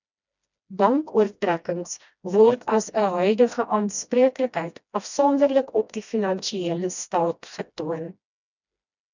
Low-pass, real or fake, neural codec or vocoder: 7.2 kHz; fake; codec, 16 kHz, 1 kbps, FreqCodec, smaller model